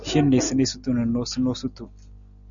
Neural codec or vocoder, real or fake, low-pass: none; real; 7.2 kHz